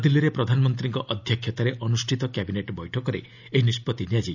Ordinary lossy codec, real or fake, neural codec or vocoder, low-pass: none; real; none; 7.2 kHz